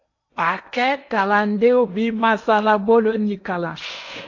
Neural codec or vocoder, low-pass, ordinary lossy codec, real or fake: codec, 16 kHz in and 24 kHz out, 0.8 kbps, FocalCodec, streaming, 65536 codes; 7.2 kHz; AAC, 48 kbps; fake